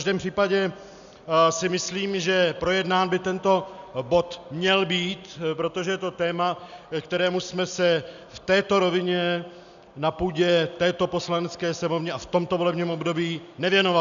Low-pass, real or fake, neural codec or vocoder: 7.2 kHz; real; none